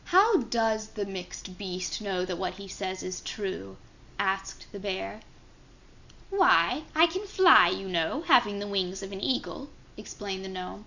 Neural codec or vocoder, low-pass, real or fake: none; 7.2 kHz; real